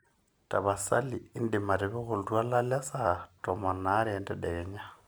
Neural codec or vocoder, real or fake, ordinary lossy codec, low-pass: none; real; none; none